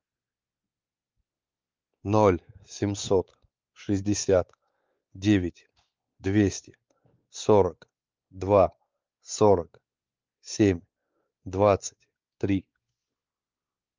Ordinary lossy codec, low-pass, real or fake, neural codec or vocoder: Opus, 24 kbps; 7.2 kHz; fake; codec, 16 kHz, 4 kbps, X-Codec, WavLM features, trained on Multilingual LibriSpeech